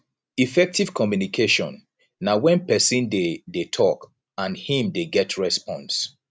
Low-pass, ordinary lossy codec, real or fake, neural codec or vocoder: none; none; real; none